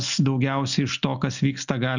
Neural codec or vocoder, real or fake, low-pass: none; real; 7.2 kHz